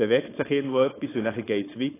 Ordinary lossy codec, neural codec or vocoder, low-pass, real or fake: AAC, 16 kbps; codec, 16 kHz, 4.8 kbps, FACodec; 3.6 kHz; fake